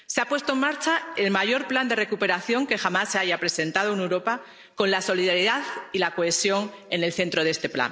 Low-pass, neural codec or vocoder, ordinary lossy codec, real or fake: none; none; none; real